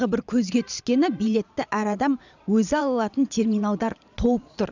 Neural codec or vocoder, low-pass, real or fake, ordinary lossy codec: vocoder, 22.05 kHz, 80 mel bands, Vocos; 7.2 kHz; fake; none